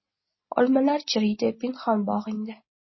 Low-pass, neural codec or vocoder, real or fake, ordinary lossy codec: 7.2 kHz; none; real; MP3, 24 kbps